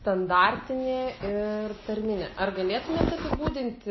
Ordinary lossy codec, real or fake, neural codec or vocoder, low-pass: MP3, 24 kbps; real; none; 7.2 kHz